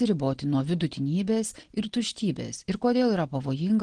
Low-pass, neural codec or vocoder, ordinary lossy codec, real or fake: 9.9 kHz; none; Opus, 16 kbps; real